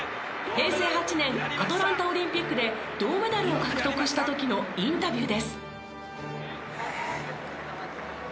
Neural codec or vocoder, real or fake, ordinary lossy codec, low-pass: none; real; none; none